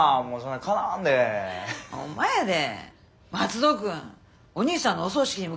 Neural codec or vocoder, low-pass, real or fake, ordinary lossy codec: none; none; real; none